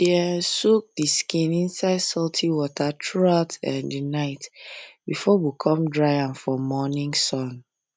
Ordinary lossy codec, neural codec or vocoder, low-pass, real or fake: none; none; none; real